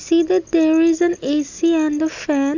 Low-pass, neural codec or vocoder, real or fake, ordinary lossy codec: 7.2 kHz; none; real; none